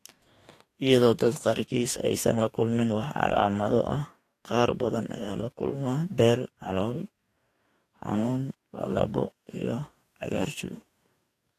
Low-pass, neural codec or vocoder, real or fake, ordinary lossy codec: 14.4 kHz; codec, 44.1 kHz, 2.6 kbps, DAC; fake; AAC, 64 kbps